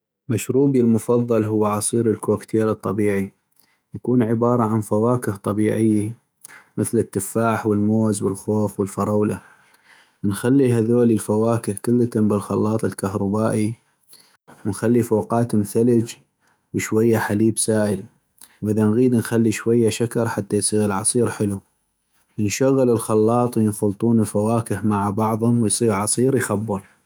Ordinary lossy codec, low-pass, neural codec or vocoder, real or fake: none; none; autoencoder, 48 kHz, 128 numbers a frame, DAC-VAE, trained on Japanese speech; fake